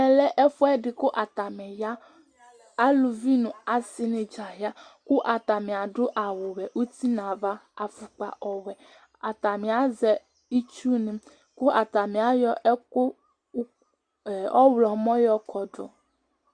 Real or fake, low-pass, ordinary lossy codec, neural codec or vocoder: real; 9.9 kHz; Opus, 64 kbps; none